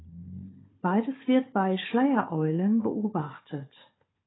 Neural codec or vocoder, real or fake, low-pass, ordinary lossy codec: codec, 16 kHz, 4 kbps, FunCodec, trained on Chinese and English, 50 frames a second; fake; 7.2 kHz; AAC, 16 kbps